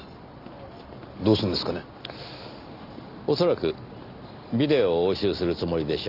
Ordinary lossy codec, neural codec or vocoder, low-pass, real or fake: none; none; 5.4 kHz; real